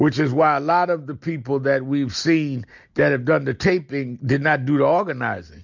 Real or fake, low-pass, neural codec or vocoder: real; 7.2 kHz; none